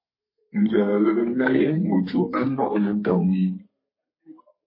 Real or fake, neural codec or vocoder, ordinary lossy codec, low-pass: fake; codec, 32 kHz, 1.9 kbps, SNAC; MP3, 24 kbps; 5.4 kHz